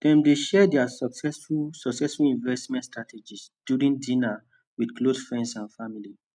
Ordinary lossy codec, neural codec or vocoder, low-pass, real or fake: none; none; 9.9 kHz; real